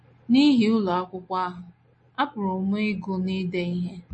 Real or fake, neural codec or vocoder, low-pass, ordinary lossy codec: real; none; 10.8 kHz; MP3, 32 kbps